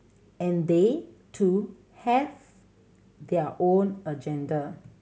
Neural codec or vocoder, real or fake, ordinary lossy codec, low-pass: none; real; none; none